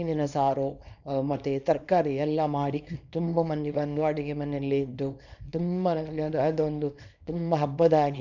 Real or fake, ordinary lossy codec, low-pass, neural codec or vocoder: fake; none; 7.2 kHz; codec, 24 kHz, 0.9 kbps, WavTokenizer, small release